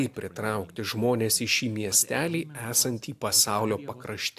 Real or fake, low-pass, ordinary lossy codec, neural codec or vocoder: real; 14.4 kHz; AAC, 96 kbps; none